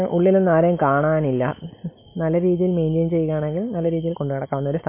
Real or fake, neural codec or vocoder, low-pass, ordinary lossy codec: real; none; 3.6 kHz; MP3, 24 kbps